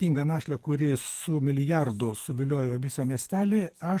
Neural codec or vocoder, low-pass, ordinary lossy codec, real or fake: codec, 44.1 kHz, 2.6 kbps, SNAC; 14.4 kHz; Opus, 24 kbps; fake